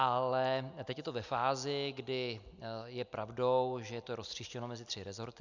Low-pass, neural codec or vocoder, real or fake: 7.2 kHz; none; real